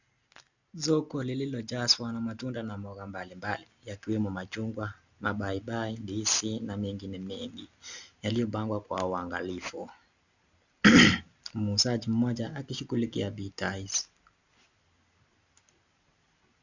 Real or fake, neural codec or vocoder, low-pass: real; none; 7.2 kHz